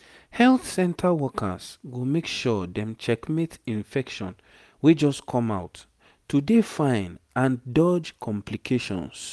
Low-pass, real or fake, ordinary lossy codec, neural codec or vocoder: none; real; none; none